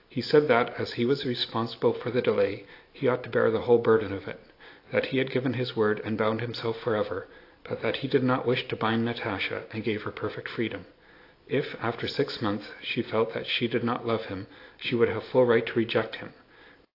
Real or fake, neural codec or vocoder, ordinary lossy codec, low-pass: real; none; AAC, 32 kbps; 5.4 kHz